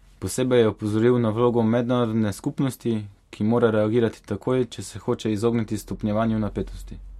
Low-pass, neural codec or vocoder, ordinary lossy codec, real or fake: 19.8 kHz; none; MP3, 64 kbps; real